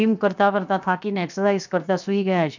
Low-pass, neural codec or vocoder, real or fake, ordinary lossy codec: 7.2 kHz; codec, 16 kHz, 0.7 kbps, FocalCodec; fake; none